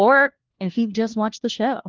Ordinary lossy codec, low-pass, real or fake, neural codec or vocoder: Opus, 16 kbps; 7.2 kHz; fake; codec, 16 kHz, 1 kbps, FunCodec, trained on LibriTTS, 50 frames a second